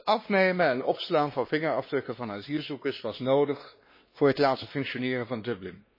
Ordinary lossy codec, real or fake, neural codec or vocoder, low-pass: MP3, 24 kbps; fake; codec, 16 kHz, 2 kbps, X-Codec, WavLM features, trained on Multilingual LibriSpeech; 5.4 kHz